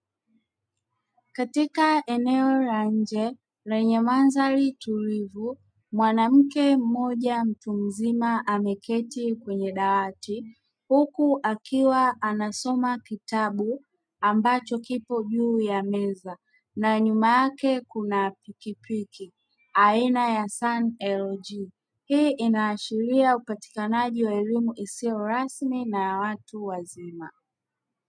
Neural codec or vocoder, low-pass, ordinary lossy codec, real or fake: none; 9.9 kHz; MP3, 96 kbps; real